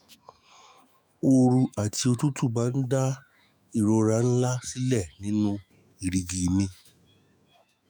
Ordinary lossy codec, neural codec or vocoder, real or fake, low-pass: none; autoencoder, 48 kHz, 128 numbers a frame, DAC-VAE, trained on Japanese speech; fake; none